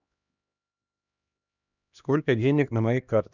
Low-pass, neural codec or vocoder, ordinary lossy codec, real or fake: 7.2 kHz; codec, 16 kHz, 1 kbps, X-Codec, HuBERT features, trained on LibriSpeech; none; fake